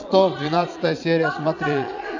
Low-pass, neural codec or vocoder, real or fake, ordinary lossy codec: 7.2 kHz; vocoder, 22.05 kHz, 80 mel bands, Vocos; fake; AAC, 48 kbps